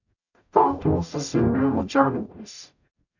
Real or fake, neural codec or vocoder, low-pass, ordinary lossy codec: fake; codec, 44.1 kHz, 0.9 kbps, DAC; 7.2 kHz; none